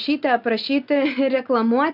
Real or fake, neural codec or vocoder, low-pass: real; none; 5.4 kHz